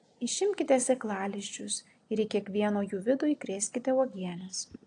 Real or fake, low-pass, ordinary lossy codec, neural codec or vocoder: real; 9.9 kHz; AAC, 48 kbps; none